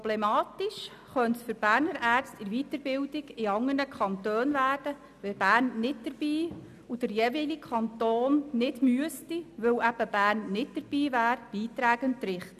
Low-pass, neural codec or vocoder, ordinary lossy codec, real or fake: 14.4 kHz; none; none; real